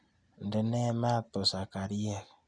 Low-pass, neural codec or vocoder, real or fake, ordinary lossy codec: 9.9 kHz; none; real; none